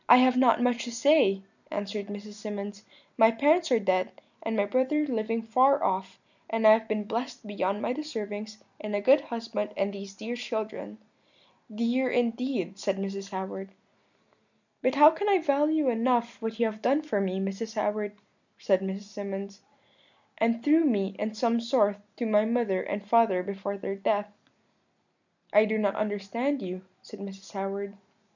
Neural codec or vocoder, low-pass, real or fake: none; 7.2 kHz; real